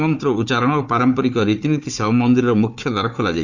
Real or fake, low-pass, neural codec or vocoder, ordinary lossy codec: fake; 7.2 kHz; codec, 16 kHz, 4 kbps, FunCodec, trained on Chinese and English, 50 frames a second; Opus, 64 kbps